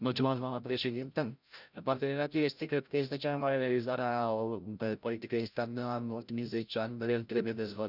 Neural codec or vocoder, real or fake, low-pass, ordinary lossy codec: codec, 16 kHz, 0.5 kbps, FreqCodec, larger model; fake; 5.4 kHz; none